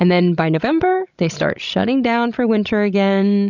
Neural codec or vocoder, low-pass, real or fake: codec, 16 kHz, 16 kbps, FreqCodec, larger model; 7.2 kHz; fake